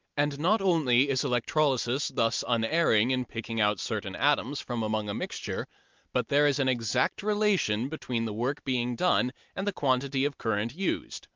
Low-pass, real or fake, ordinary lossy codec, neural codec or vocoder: 7.2 kHz; real; Opus, 32 kbps; none